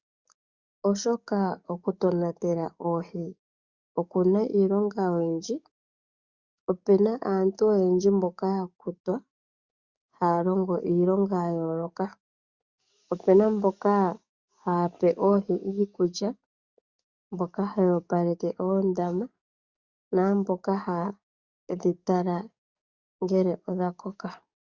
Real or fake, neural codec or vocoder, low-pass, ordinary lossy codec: fake; codec, 44.1 kHz, 7.8 kbps, DAC; 7.2 kHz; Opus, 64 kbps